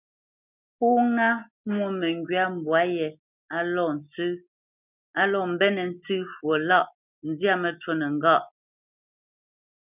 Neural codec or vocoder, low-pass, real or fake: none; 3.6 kHz; real